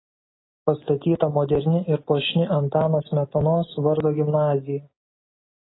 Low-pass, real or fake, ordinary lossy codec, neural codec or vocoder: 7.2 kHz; real; AAC, 16 kbps; none